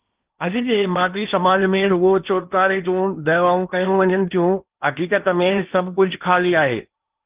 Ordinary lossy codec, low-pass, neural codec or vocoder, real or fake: Opus, 32 kbps; 3.6 kHz; codec, 16 kHz in and 24 kHz out, 0.8 kbps, FocalCodec, streaming, 65536 codes; fake